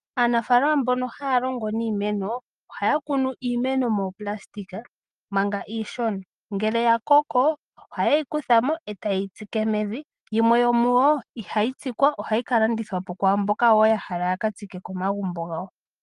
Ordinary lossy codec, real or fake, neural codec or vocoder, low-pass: Opus, 32 kbps; real; none; 10.8 kHz